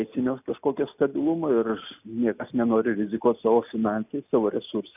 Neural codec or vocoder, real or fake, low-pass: none; real; 3.6 kHz